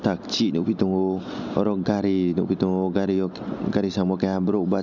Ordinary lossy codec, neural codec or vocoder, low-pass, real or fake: none; none; 7.2 kHz; real